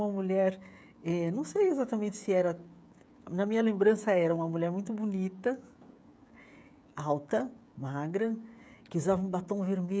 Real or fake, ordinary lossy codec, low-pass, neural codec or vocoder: fake; none; none; codec, 16 kHz, 16 kbps, FreqCodec, smaller model